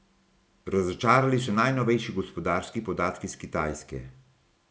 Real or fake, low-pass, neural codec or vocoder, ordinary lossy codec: real; none; none; none